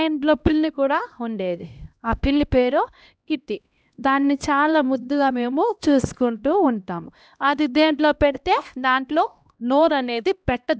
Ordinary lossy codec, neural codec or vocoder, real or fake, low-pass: none; codec, 16 kHz, 1 kbps, X-Codec, HuBERT features, trained on LibriSpeech; fake; none